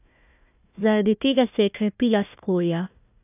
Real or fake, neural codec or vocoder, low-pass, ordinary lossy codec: fake; codec, 16 kHz, 1 kbps, FunCodec, trained on Chinese and English, 50 frames a second; 3.6 kHz; none